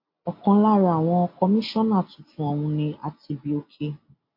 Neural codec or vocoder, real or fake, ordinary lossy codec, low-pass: none; real; MP3, 32 kbps; 5.4 kHz